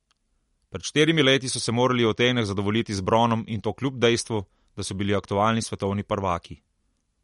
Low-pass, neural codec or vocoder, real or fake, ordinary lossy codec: 19.8 kHz; none; real; MP3, 48 kbps